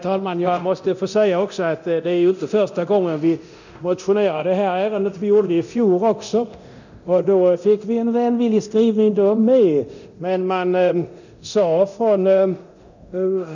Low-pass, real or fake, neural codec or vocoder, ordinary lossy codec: 7.2 kHz; fake; codec, 24 kHz, 0.9 kbps, DualCodec; none